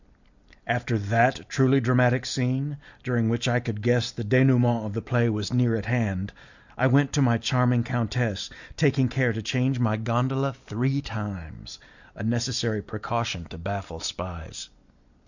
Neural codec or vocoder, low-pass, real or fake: none; 7.2 kHz; real